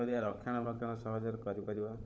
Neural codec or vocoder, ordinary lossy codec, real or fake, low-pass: codec, 16 kHz, 16 kbps, FunCodec, trained on LibriTTS, 50 frames a second; none; fake; none